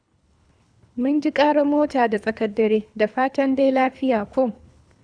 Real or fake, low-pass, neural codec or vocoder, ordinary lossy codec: fake; 9.9 kHz; codec, 24 kHz, 3 kbps, HILCodec; none